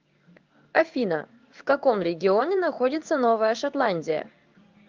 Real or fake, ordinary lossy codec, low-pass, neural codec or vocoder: fake; Opus, 24 kbps; 7.2 kHz; codec, 16 kHz in and 24 kHz out, 1 kbps, XY-Tokenizer